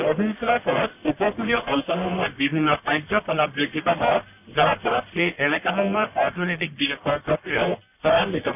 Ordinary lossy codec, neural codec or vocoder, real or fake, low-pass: none; codec, 44.1 kHz, 1.7 kbps, Pupu-Codec; fake; 3.6 kHz